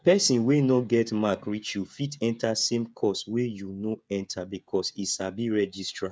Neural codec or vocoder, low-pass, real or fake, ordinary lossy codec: codec, 16 kHz, 16 kbps, FreqCodec, smaller model; none; fake; none